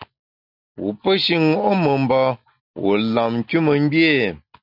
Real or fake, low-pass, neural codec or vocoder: real; 5.4 kHz; none